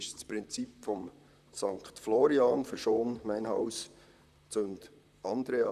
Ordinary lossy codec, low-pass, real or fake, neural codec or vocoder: none; 14.4 kHz; fake; vocoder, 44.1 kHz, 128 mel bands, Pupu-Vocoder